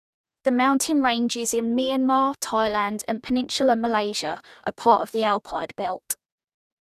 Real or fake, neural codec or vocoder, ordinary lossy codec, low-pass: fake; codec, 44.1 kHz, 2.6 kbps, DAC; none; 14.4 kHz